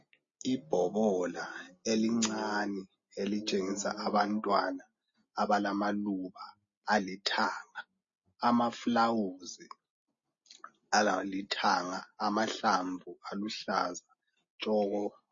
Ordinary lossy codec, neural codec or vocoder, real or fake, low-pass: MP3, 32 kbps; none; real; 7.2 kHz